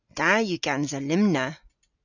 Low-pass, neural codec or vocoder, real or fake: 7.2 kHz; none; real